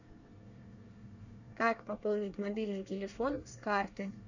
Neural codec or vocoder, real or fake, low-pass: codec, 24 kHz, 1 kbps, SNAC; fake; 7.2 kHz